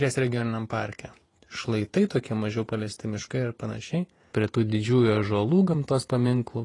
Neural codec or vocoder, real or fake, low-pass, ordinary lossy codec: vocoder, 48 kHz, 128 mel bands, Vocos; fake; 10.8 kHz; AAC, 32 kbps